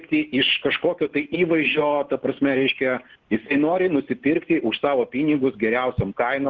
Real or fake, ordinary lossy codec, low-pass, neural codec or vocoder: real; Opus, 16 kbps; 7.2 kHz; none